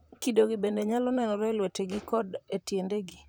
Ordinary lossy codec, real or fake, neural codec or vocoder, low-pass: none; fake; vocoder, 44.1 kHz, 128 mel bands, Pupu-Vocoder; none